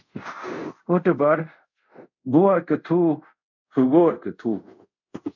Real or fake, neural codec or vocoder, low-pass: fake; codec, 24 kHz, 0.5 kbps, DualCodec; 7.2 kHz